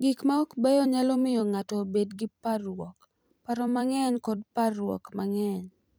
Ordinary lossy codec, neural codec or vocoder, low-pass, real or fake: none; vocoder, 44.1 kHz, 128 mel bands every 256 samples, BigVGAN v2; none; fake